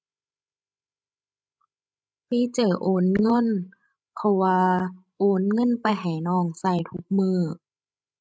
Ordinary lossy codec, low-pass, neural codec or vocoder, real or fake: none; none; codec, 16 kHz, 16 kbps, FreqCodec, larger model; fake